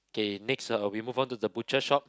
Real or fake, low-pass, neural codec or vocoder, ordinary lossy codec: real; none; none; none